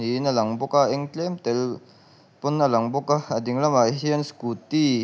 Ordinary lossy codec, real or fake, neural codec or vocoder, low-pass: none; real; none; none